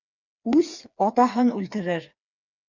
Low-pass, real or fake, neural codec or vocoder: 7.2 kHz; fake; codec, 16 kHz, 4 kbps, FreqCodec, smaller model